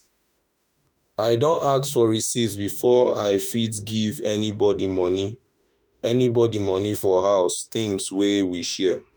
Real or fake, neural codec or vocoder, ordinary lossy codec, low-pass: fake; autoencoder, 48 kHz, 32 numbers a frame, DAC-VAE, trained on Japanese speech; none; none